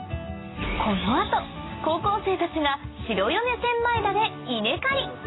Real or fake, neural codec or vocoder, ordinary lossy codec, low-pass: real; none; AAC, 16 kbps; 7.2 kHz